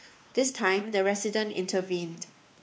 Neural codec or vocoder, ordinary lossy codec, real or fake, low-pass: codec, 16 kHz, 2 kbps, X-Codec, WavLM features, trained on Multilingual LibriSpeech; none; fake; none